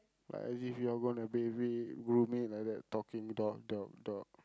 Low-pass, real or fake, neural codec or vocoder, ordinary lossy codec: none; real; none; none